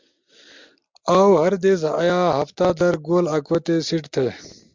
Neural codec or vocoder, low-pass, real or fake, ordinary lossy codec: none; 7.2 kHz; real; MP3, 64 kbps